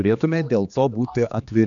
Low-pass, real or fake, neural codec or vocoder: 7.2 kHz; fake; codec, 16 kHz, 2 kbps, X-Codec, HuBERT features, trained on general audio